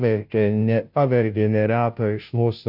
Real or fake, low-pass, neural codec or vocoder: fake; 5.4 kHz; codec, 16 kHz, 0.5 kbps, FunCodec, trained on Chinese and English, 25 frames a second